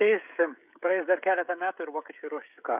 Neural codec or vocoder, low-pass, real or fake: codec, 16 kHz, 16 kbps, FreqCodec, smaller model; 3.6 kHz; fake